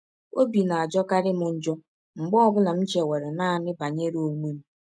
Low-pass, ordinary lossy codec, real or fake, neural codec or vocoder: none; none; real; none